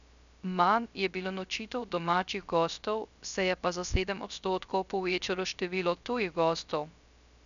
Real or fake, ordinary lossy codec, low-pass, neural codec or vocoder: fake; none; 7.2 kHz; codec, 16 kHz, 0.3 kbps, FocalCodec